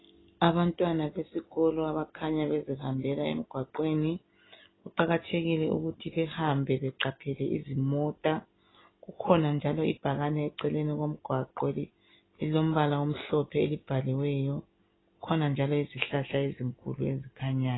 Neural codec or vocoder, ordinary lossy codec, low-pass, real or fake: none; AAC, 16 kbps; 7.2 kHz; real